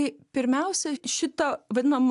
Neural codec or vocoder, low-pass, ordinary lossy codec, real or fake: none; 10.8 kHz; AAC, 96 kbps; real